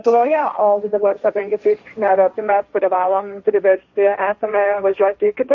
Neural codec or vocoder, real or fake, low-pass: codec, 16 kHz, 1.1 kbps, Voila-Tokenizer; fake; 7.2 kHz